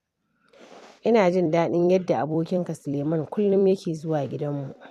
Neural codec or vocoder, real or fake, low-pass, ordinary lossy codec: vocoder, 44.1 kHz, 128 mel bands every 256 samples, BigVGAN v2; fake; 14.4 kHz; none